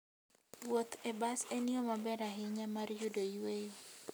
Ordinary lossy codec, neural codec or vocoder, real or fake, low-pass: none; none; real; none